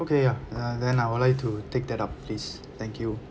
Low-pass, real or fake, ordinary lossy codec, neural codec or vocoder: none; real; none; none